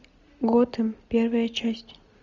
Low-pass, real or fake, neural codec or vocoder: 7.2 kHz; real; none